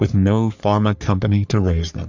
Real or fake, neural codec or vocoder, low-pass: fake; codec, 44.1 kHz, 3.4 kbps, Pupu-Codec; 7.2 kHz